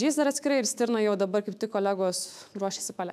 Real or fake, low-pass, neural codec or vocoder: fake; 14.4 kHz; autoencoder, 48 kHz, 128 numbers a frame, DAC-VAE, trained on Japanese speech